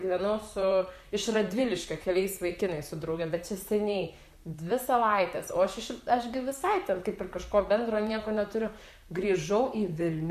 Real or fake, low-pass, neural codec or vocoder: fake; 14.4 kHz; vocoder, 44.1 kHz, 128 mel bands, Pupu-Vocoder